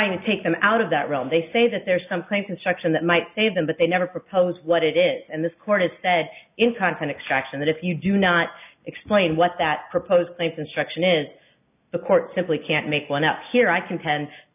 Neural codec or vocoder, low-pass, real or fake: none; 3.6 kHz; real